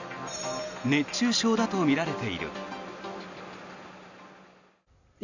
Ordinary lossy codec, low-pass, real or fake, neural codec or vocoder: none; 7.2 kHz; real; none